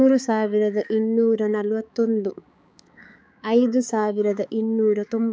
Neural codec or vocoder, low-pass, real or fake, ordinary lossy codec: codec, 16 kHz, 4 kbps, X-Codec, HuBERT features, trained on balanced general audio; none; fake; none